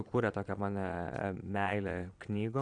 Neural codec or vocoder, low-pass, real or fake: vocoder, 22.05 kHz, 80 mel bands, Vocos; 9.9 kHz; fake